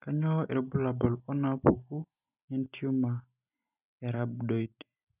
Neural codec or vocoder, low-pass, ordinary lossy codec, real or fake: none; 3.6 kHz; none; real